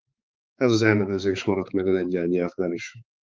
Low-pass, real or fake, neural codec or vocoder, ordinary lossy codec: 7.2 kHz; fake; codec, 16 kHz, 4 kbps, X-Codec, HuBERT features, trained on balanced general audio; Opus, 32 kbps